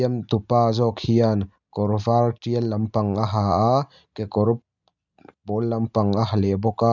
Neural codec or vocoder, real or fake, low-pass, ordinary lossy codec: none; real; 7.2 kHz; none